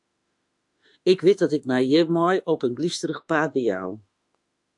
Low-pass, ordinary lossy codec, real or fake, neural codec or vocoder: 10.8 kHz; AAC, 64 kbps; fake; autoencoder, 48 kHz, 32 numbers a frame, DAC-VAE, trained on Japanese speech